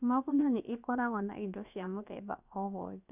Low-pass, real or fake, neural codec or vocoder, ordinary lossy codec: 3.6 kHz; fake; codec, 16 kHz, about 1 kbps, DyCAST, with the encoder's durations; none